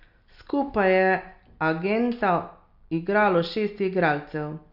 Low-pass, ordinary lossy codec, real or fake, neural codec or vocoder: 5.4 kHz; none; real; none